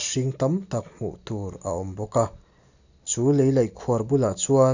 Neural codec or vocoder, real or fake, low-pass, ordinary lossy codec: none; real; 7.2 kHz; none